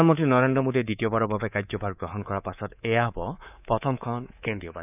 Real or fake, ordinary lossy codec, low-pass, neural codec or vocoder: fake; none; 3.6 kHz; codec, 24 kHz, 3.1 kbps, DualCodec